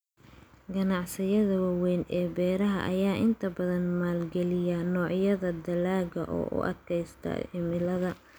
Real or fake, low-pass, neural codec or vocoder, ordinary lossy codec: real; none; none; none